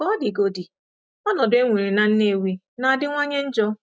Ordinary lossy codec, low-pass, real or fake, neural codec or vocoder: none; none; real; none